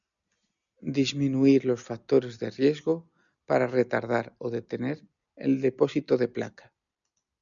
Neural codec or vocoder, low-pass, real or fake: none; 7.2 kHz; real